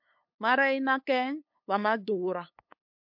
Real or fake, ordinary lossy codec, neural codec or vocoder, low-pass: fake; MP3, 48 kbps; codec, 16 kHz, 8 kbps, FunCodec, trained on LibriTTS, 25 frames a second; 5.4 kHz